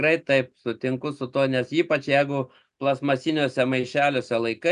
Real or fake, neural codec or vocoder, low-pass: real; none; 10.8 kHz